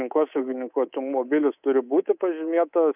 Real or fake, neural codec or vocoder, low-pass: fake; codec, 24 kHz, 3.1 kbps, DualCodec; 3.6 kHz